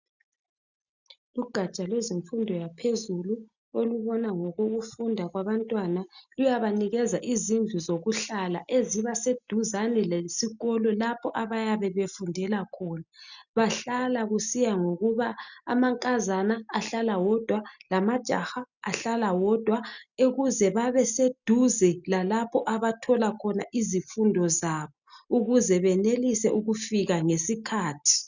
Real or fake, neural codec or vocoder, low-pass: real; none; 7.2 kHz